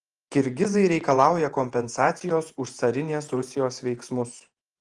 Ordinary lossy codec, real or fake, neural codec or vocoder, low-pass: Opus, 24 kbps; fake; vocoder, 48 kHz, 128 mel bands, Vocos; 10.8 kHz